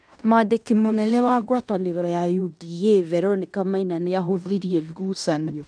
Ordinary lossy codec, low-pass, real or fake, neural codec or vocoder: none; 9.9 kHz; fake; codec, 16 kHz in and 24 kHz out, 0.9 kbps, LongCat-Audio-Codec, fine tuned four codebook decoder